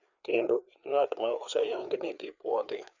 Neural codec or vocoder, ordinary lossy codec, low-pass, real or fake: codec, 16 kHz, 4 kbps, FreqCodec, larger model; none; 7.2 kHz; fake